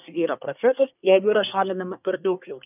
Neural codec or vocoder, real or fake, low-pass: codec, 24 kHz, 1 kbps, SNAC; fake; 3.6 kHz